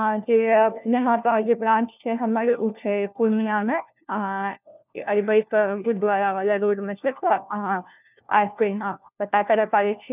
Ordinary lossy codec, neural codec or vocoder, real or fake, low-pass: none; codec, 16 kHz, 1 kbps, FunCodec, trained on LibriTTS, 50 frames a second; fake; 3.6 kHz